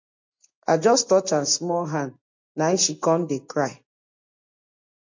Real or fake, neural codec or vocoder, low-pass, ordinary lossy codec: real; none; 7.2 kHz; MP3, 48 kbps